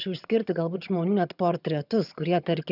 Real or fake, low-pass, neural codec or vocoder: fake; 5.4 kHz; vocoder, 22.05 kHz, 80 mel bands, HiFi-GAN